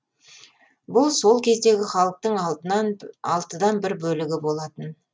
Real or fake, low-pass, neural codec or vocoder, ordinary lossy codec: real; none; none; none